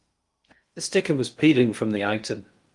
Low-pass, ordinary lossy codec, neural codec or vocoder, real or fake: 10.8 kHz; Opus, 32 kbps; codec, 16 kHz in and 24 kHz out, 0.6 kbps, FocalCodec, streaming, 2048 codes; fake